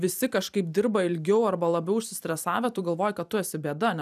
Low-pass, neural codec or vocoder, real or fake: 14.4 kHz; vocoder, 44.1 kHz, 128 mel bands every 256 samples, BigVGAN v2; fake